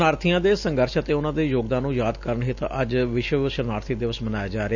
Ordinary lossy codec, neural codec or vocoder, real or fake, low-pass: none; none; real; 7.2 kHz